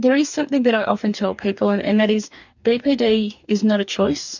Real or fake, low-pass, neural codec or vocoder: fake; 7.2 kHz; codec, 44.1 kHz, 2.6 kbps, DAC